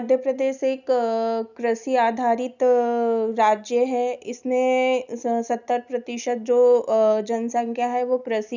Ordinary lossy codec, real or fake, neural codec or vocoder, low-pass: none; real; none; 7.2 kHz